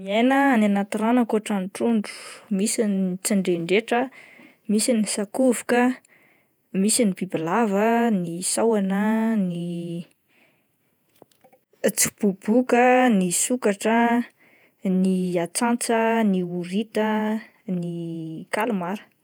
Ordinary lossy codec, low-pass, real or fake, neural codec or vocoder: none; none; fake; vocoder, 48 kHz, 128 mel bands, Vocos